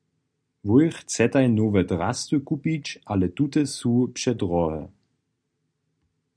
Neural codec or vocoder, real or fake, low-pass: none; real; 9.9 kHz